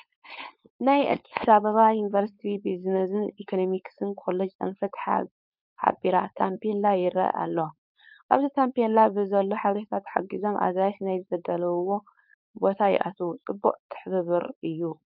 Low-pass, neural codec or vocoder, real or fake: 5.4 kHz; codec, 16 kHz, 4.8 kbps, FACodec; fake